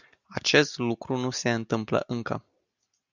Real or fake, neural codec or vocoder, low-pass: real; none; 7.2 kHz